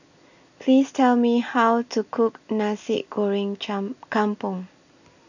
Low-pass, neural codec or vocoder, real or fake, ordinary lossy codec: 7.2 kHz; none; real; none